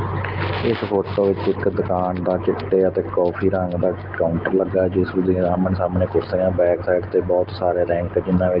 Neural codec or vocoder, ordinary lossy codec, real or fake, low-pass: none; Opus, 16 kbps; real; 5.4 kHz